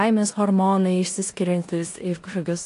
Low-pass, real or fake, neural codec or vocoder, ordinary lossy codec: 10.8 kHz; fake; codec, 16 kHz in and 24 kHz out, 0.9 kbps, LongCat-Audio-Codec, four codebook decoder; AAC, 48 kbps